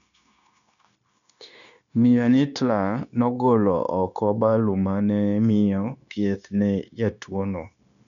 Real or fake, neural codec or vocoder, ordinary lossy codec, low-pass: fake; codec, 16 kHz, 0.9 kbps, LongCat-Audio-Codec; none; 7.2 kHz